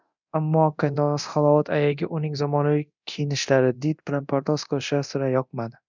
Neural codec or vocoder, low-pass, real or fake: codec, 24 kHz, 0.9 kbps, DualCodec; 7.2 kHz; fake